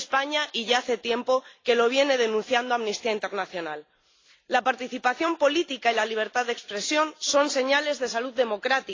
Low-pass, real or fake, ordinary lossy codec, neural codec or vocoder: 7.2 kHz; real; AAC, 32 kbps; none